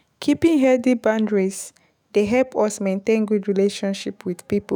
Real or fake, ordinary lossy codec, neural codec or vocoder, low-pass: fake; none; autoencoder, 48 kHz, 128 numbers a frame, DAC-VAE, trained on Japanese speech; none